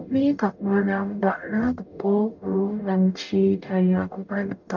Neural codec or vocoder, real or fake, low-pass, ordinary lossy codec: codec, 44.1 kHz, 0.9 kbps, DAC; fake; 7.2 kHz; none